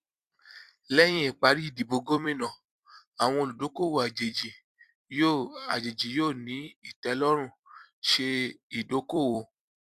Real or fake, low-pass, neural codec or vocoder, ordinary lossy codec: fake; 14.4 kHz; vocoder, 44.1 kHz, 128 mel bands every 256 samples, BigVGAN v2; Opus, 64 kbps